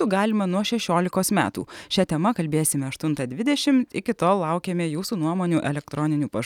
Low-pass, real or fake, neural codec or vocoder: 19.8 kHz; real; none